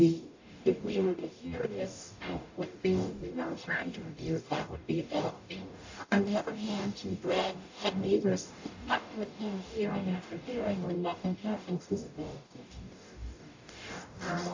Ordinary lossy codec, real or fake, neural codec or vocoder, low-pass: AAC, 48 kbps; fake; codec, 44.1 kHz, 0.9 kbps, DAC; 7.2 kHz